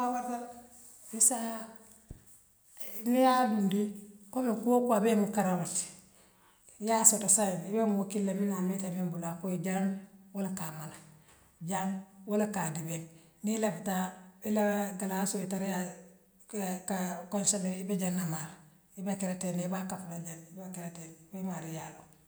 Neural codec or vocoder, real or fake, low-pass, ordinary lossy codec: none; real; none; none